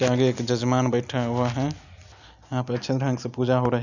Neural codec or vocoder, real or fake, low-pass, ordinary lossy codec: none; real; 7.2 kHz; none